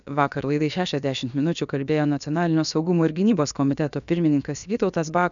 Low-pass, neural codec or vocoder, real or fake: 7.2 kHz; codec, 16 kHz, about 1 kbps, DyCAST, with the encoder's durations; fake